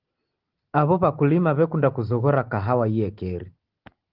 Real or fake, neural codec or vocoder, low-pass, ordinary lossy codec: real; none; 5.4 kHz; Opus, 16 kbps